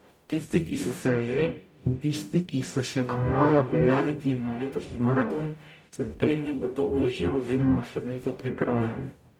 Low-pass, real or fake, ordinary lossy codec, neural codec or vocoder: 19.8 kHz; fake; MP3, 96 kbps; codec, 44.1 kHz, 0.9 kbps, DAC